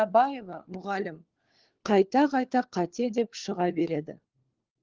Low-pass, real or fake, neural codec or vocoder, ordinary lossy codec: 7.2 kHz; fake; codec, 16 kHz, 4 kbps, FunCodec, trained on LibriTTS, 50 frames a second; Opus, 16 kbps